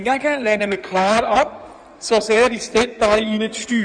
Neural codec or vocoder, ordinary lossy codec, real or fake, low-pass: codec, 16 kHz in and 24 kHz out, 2.2 kbps, FireRedTTS-2 codec; none; fake; 9.9 kHz